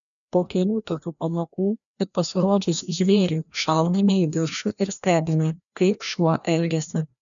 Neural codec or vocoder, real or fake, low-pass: codec, 16 kHz, 1 kbps, FreqCodec, larger model; fake; 7.2 kHz